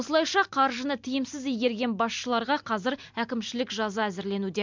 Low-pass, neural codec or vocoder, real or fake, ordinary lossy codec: 7.2 kHz; none; real; MP3, 64 kbps